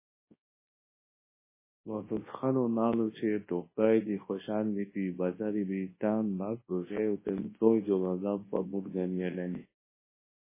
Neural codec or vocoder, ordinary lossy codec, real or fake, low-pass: codec, 24 kHz, 0.9 kbps, WavTokenizer, large speech release; MP3, 16 kbps; fake; 3.6 kHz